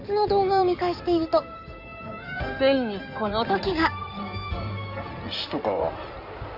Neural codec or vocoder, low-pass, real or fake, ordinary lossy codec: codec, 16 kHz in and 24 kHz out, 2.2 kbps, FireRedTTS-2 codec; 5.4 kHz; fake; none